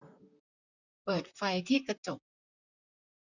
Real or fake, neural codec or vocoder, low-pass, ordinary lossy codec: fake; vocoder, 44.1 kHz, 128 mel bands, Pupu-Vocoder; 7.2 kHz; none